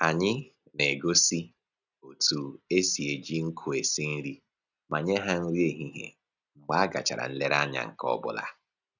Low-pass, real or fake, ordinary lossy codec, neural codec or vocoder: 7.2 kHz; real; none; none